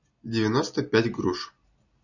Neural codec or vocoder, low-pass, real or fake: none; 7.2 kHz; real